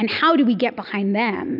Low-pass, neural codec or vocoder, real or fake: 5.4 kHz; none; real